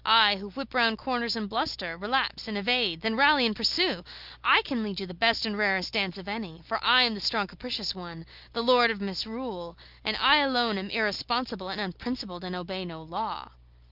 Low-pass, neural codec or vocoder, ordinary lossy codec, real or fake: 5.4 kHz; none; Opus, 24 kbps; real